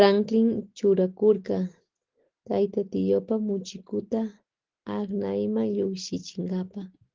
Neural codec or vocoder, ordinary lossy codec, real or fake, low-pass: none; Opus, 16 kbps; real; 7.2 kHz